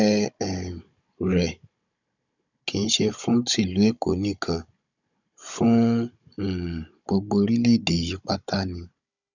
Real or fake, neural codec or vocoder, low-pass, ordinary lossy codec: fake; codec, 16 kHz, 16 kbps, FunCodec, trained on Chinese and English, 50 frames a second; 7.2 kHz; none